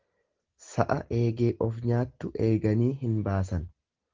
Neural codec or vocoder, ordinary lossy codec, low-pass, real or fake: none; Opus, 16 kbps; 7.2 kHz; real